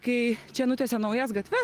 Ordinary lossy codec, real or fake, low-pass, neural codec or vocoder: Opus, 24 kbps; real; 14.4 kHz; none